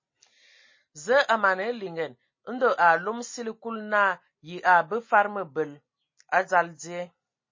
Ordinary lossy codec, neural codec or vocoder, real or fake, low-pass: MP3, 32 kbps; none; real; 7.2 kHz